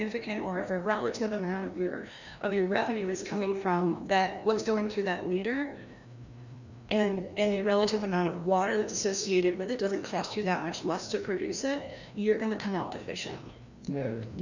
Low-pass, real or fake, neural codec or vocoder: 7.2 kHz; fake; codec, 16 kHz, 1 kbps, FreqCodec, larger model